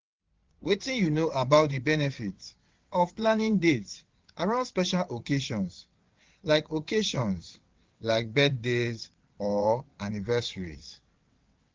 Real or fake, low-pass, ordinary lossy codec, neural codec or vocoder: fake; 7.2 kHz; Opus, 32 kbps; vocoder, 22.05 kHz, 80 mel bands, WaveNeXt